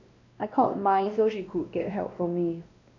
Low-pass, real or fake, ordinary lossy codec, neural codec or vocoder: 7.2 kHz; fake; AAC, 48 kbps; codec, 16 kHz, 1 kbps, X-Codec, WavLM features, trained on Multilingual LibriSpeech